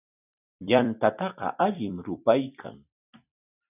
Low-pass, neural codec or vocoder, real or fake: 3.6 kHz; vocoder, 44.1 kHz, 128 mel bands every 256 samples, BigVGAN v2; fake